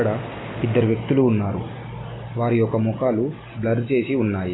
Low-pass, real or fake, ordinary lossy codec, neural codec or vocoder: 7.2 kHz; real; AAC, 16 kbps; none